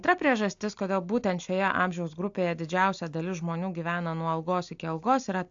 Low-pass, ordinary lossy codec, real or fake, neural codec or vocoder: 7.2 kHz; AAC, 64 kbps; real; none